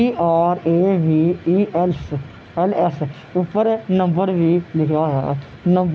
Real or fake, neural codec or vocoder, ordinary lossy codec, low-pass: real; none; none; none